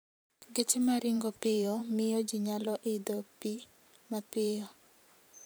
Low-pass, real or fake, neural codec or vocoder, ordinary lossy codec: none; fake; vocoder, 44.1 kHz, 128 mel bands every 512 samples, BigVGAN v2; none